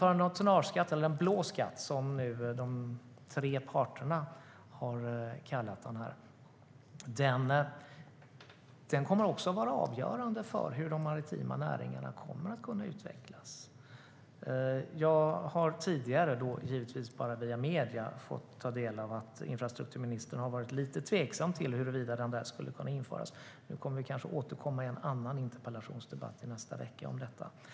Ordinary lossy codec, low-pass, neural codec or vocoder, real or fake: none; none; none; real